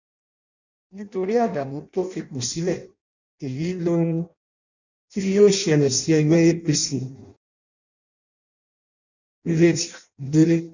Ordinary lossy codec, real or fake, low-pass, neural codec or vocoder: none; fake; 7.2 kHz; codec, 16 kHz in and 24 kHz out, 0.6 kbps, FireRedTTS-2 codec